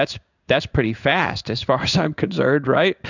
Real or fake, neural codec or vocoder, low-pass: fake; codec, 16 kHz in and 24 kHz out, 1 kbps, XY-Tokenizer; 7.2 kHz